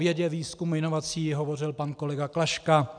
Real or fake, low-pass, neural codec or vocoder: real; 9.9 kHz; none